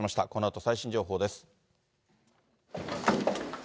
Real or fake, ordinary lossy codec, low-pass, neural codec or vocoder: real; none; none; none